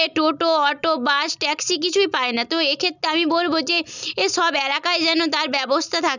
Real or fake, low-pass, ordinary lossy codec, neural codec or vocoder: real; 7.2 kHz; none; none